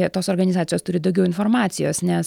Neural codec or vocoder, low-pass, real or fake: none; 19.8 kHz; real